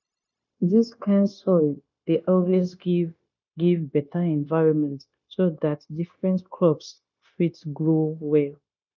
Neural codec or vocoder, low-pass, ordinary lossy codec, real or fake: codec, 16 kHz, 0.9 kbps, LongCat-Audio-Codec; 7.2 kHz; none; fake